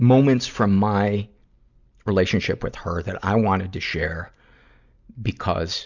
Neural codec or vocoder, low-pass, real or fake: none; 7.2 kHz; real